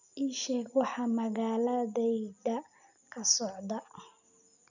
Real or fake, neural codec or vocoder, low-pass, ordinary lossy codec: real; none; 7.2 kHz; none